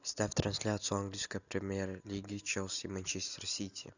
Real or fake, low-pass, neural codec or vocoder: real; 7.2 kHz; none